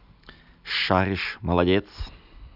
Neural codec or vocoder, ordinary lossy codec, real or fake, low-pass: none; none; real; 5.4 kHz